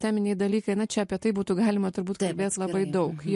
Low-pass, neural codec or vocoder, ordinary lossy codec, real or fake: 14.4 kHz; none; MP3, 48 kbps; real